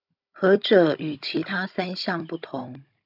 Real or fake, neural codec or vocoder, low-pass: fake; codec, 16 kHz, 16 kbps, FunCodec, trained on Chinese and English, 50 frames a second; 5.4 kHz